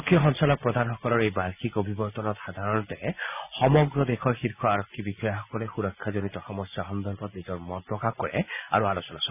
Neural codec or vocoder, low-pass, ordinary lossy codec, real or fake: none; 3.6 kHz; none; real